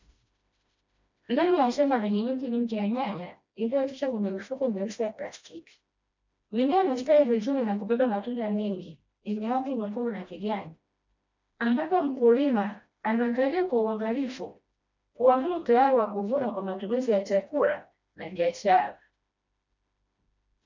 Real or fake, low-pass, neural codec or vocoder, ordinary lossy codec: fake; 7.2 kHz; codec, 16 kHz, 1 kbps, FreqCodec, smaller model; MP3, 64 kbps